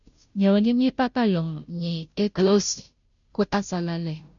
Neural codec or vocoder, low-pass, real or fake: codec, 16 kHz, 0.5 kbps, FunCodec, trained on Chinese and English, 25 frames a second; 7.2 kHz; fake